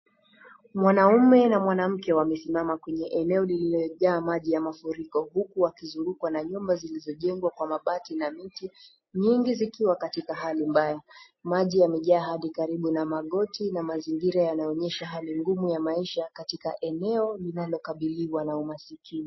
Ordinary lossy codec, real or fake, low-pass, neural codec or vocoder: MP3, 24 kbps; real; 7.2 kHz; none